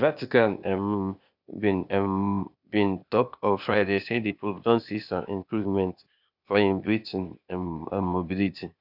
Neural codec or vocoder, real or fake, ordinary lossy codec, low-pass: codec, 16 kHz, 0.8 kbps, ZipCodec; fake; none; 5.4 kHz